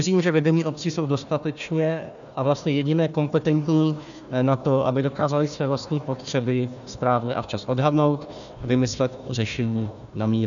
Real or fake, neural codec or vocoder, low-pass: fake; codec, 16 kHz, 1 kbps, FunCodec, trained on Chinese and English, 50 frames a second; 7.2 kHz